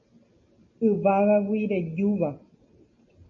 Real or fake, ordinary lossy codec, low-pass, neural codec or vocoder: real; MP3, 32 kbps; 7.2 kHz; none